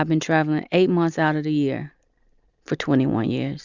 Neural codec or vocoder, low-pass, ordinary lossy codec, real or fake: none; 7.2 kHz; Opus, 64 kbps; real